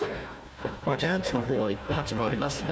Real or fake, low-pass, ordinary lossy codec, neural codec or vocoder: fake; none; none; codec, 16 kHz, 1 kbps, FunCodec, trained on Chinese and English, 50 frames a second